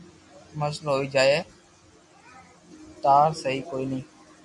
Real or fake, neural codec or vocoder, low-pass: real; none; 10.8 kHz